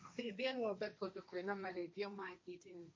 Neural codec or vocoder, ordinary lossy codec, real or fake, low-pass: codec, 16 kHz, 1.1 kbps, Voila-Tokenizer; none; fake; none